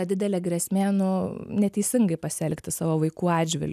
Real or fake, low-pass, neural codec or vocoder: real; 14.4 kHz; none